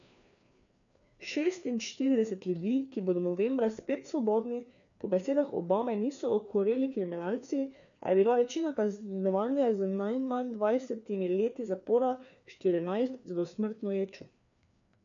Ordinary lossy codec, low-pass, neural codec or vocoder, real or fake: none; 7.2 kHz; codec, 16 kHz, 2 kbps, FreqCodec, larger model; fake